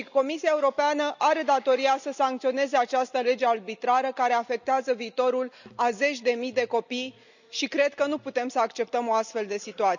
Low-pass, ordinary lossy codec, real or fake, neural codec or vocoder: 7.2 kHz; none; real; none